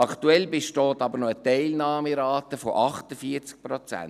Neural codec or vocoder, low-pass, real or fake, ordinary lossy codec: none; 14.4 kHz; real; none